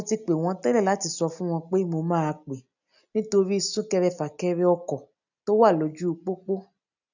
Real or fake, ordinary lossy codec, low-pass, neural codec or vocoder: real; none; 7.2 kHz; none